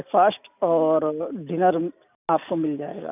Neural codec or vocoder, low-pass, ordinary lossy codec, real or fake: none; 3.6 kHz; none; real